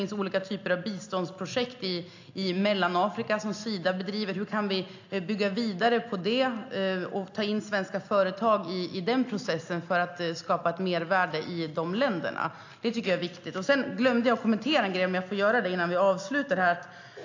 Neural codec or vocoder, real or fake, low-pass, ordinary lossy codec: none; real; 7.2 kHz; AAC, 48 kbps